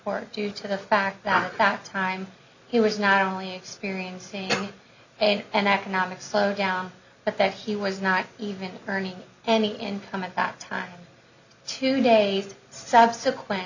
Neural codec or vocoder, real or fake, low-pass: none; real; 7.2 kHz